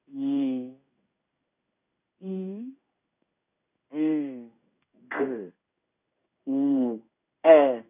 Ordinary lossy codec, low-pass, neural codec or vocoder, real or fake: none; 3.6 kHz; codec, 16 kHz in and 24 kHz out, 1 kbps, XY-Tokenizer; fake